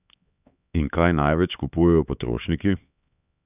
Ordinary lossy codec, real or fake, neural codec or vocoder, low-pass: none; fake; codec, 16 kHz, 4 kbps, X-Codec, HuBERT features, trained on LibriSpeech; 3.6 kHz